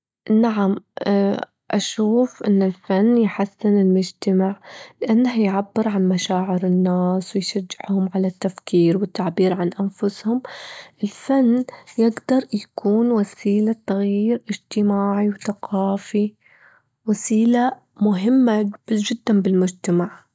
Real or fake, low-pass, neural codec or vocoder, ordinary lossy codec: real; none; none; none